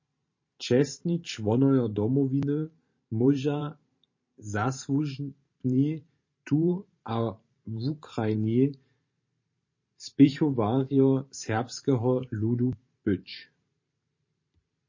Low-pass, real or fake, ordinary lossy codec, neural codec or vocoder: 7.2 kHz; fake; MP3, 32 kbps; vocoder, 44.1 kHz, 128 mel bands every 512 samples, BigVGAN v2